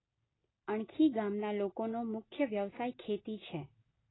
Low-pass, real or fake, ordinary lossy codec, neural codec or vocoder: 7.2 kHz; real; AAC, 16 kbps; none